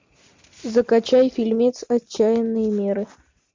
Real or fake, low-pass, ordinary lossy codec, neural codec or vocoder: real; 7.2 kHz; MP3, 48 kbps; none